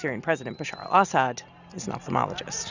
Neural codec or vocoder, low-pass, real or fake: none; 7.2 kHz; real